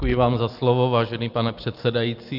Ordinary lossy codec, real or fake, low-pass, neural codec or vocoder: Opus, 32 kbps; real; 5.4 kHz; none